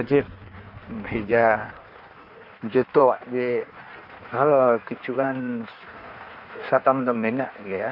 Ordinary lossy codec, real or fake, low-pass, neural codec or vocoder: none; fake; 5.4 kHz; codec, 16 kHz in and 24 kHz out, 1.1 kbps, FireRedTTS-2 codec